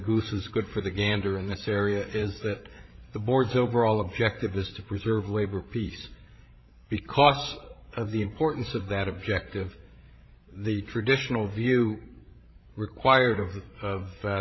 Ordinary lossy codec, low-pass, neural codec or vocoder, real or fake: MP3, 24 kbps; 7.2 kHz; codec, 16 kHz, 16 kbps, FreqCodec, larger model; fake